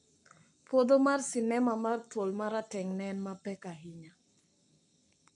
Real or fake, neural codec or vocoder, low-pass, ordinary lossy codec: fake; codec, 44.1 kHz, 7.8 kbps, Pupu-Codec; 10.8 kHz; none